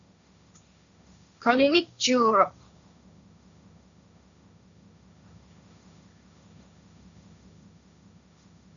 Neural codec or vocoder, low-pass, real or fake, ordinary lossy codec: codec, 16 kHz, 1.1 kbps, Voila-Tokenizer; 7.2 kHz; fake; MP3, 96 kbps